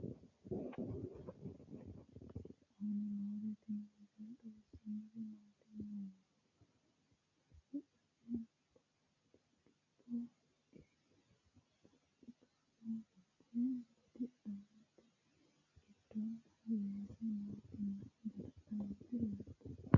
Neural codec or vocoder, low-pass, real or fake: none; 7.2 kHz; real